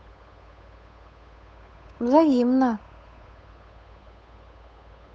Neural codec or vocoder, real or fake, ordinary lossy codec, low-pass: codec, 16 kHz, 8 kbps, FunCodec, trained on Chinese and English, 25 frames a second; fake; none; none